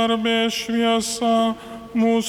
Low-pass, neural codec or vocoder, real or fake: 19.8 kHz; none; real